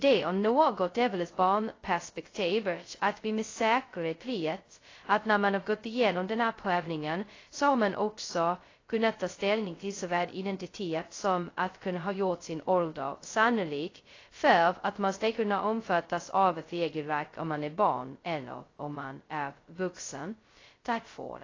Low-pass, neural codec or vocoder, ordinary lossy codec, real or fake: 7.2 kHz; codec, 16 kHz, 0.2 kbps, FocalCodec; AAC, 32 kbps; fake